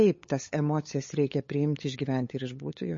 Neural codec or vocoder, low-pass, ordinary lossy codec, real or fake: codec, 16 kHz, 8 kbps, FunCodec, trained on LibriTTS, 25 frames a second; 7.2 kHz; MP3, 32 kbps; fake